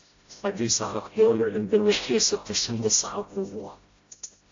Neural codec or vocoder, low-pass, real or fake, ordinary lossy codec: codec, 16 kHz, 0.5 kbps, FreqCodec, smaller model; 7.2 kHz; fake; AAC, 48 kbps